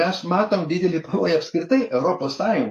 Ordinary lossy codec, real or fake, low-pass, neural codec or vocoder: AAC, 64 kbps; fake; 14.4 kHz; codec, 44.1 kHz, 7.8 kbps, DAC